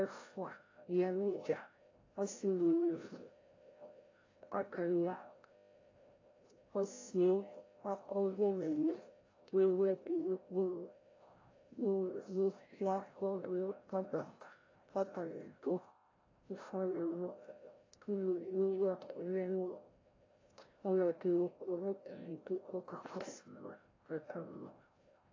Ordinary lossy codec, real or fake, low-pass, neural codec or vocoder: AAC, 32 kbps; fake; 7.2 kHz; codec, 16 kHz, 0.5 kbps, FreqCodec, larger model